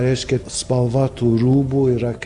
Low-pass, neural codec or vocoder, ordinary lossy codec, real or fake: 10.8 kHz; none; AAC, 64 kbps; real